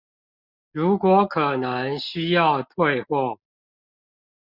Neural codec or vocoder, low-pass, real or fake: none; 5.4 kHz; real